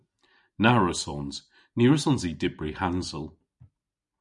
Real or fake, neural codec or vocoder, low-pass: real; none; 10.8 kHz